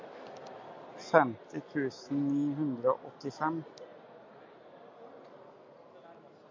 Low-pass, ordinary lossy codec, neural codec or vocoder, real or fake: 7.2 kHz; MP3, 48 kbps; none; real